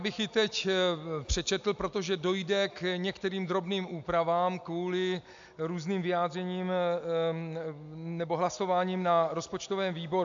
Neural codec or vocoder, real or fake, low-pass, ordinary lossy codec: none; real; 7.2 kHz; AAC, 64 kbps